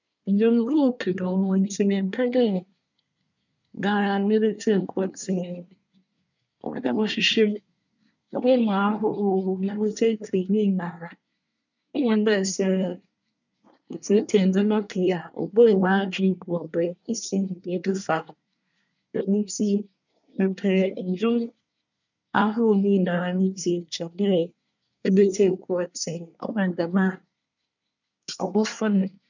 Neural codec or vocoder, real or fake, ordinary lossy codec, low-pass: codec, 24 kHz, 1 kbps, SNAC; fake; none; 7.2 kHz